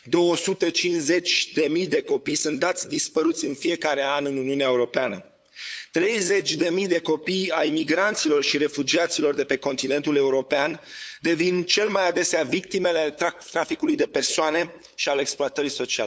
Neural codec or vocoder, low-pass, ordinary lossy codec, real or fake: codec, 16 kHz, 8 kbps, FunCodec, trained on LibriTTS, 25 frames a second; none; none; fake